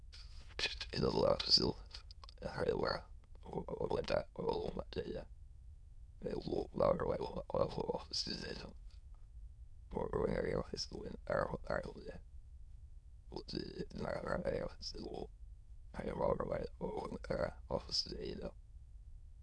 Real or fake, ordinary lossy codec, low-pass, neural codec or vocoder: fake; none; none; autoencoder, 22.05 kHz, a latent of 192 numbers a frame, VITS, trained on many speakers